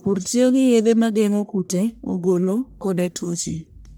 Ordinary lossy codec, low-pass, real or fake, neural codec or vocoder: none; none; fake; codec, 44.1 kHz, 1.7 kbps, Pupu-Codec